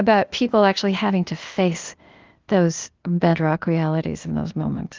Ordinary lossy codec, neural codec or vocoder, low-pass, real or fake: Opus, 32 kbps; codec, 16 kHz, 0.8 kbps, ZipCodec; 7.2 kHz; fake